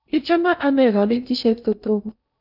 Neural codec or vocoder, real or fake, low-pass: codec, 16 kHz in and 24 kHz out, 0.8 kbps, FocalCodec, streaming, 65536 codes; fake; 5.4 kHz